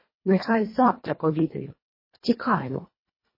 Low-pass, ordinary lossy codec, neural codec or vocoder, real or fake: 5.4 kHz; MP3, 24 kbps; codec, 24 kHz, 1.5 kbps, HILCodec; fake